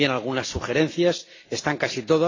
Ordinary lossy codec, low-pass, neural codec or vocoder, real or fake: AAC, 32 kbps; 7.2 kHz; none; real